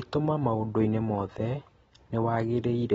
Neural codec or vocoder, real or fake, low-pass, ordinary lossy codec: none; real; 19.8 kHz; AAC, 24 kbps